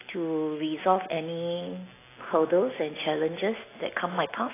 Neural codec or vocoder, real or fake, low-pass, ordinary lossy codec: none; real; 3.6 kHz; AAC, 16 kbps